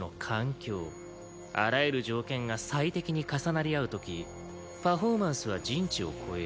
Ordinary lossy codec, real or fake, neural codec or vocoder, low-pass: none; real; none; none